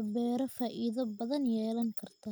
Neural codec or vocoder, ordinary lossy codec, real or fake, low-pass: none; none; real; none